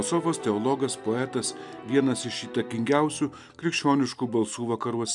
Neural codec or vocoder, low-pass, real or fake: none; 10.8 kHz; real